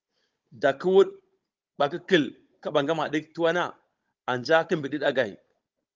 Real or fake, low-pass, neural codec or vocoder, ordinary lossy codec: fake; 7.2 kHz; codec, 16 kHz, 16 kbps, FunCodec, trained on Chinese and English, 50 frames a second; Opus, 32 kbps